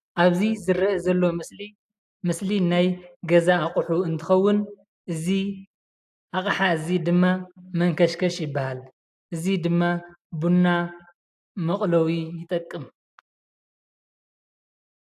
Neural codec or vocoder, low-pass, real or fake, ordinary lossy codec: none; 14.4 kHz; real; AAC, 96 kbps